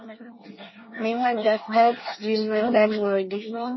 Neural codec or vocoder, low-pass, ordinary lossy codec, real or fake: codec, 24 kHz, 1 kbps, SNAC; 7.2 kHz; MP3, 24 kbps; fake